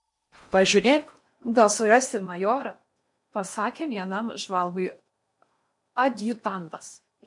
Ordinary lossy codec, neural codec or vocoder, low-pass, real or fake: MP3, 64 kbps; codec, 16 kHz in and 24 kHz out, 0.8 kbps, FocalCodec, streaming, 65536 codes; 10.8 kHz; fake